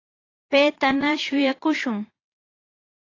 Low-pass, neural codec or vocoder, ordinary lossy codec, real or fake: 7.2 kHz; vocoder, 22.05 kHz, 80 mel bands, Vocos; AAC, 32 kbps; fake